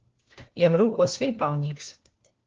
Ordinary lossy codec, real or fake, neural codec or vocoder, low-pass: Opus, 16 kbps; fake; codec, 16 kHz, 1 kbps, FunCodec, trained on LibriTTS, 50 frames a second; 7.2 kHz